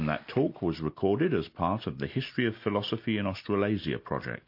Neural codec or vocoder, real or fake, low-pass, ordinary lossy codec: none; real; 5.4 kHz; MP3, 32 kbps